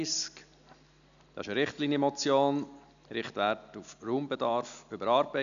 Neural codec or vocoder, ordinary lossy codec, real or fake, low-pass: none; none; real; 7.2 kHz